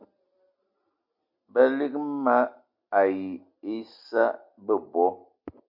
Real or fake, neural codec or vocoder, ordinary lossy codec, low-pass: real; none; MP3, 48 kbps; 5.4 kHz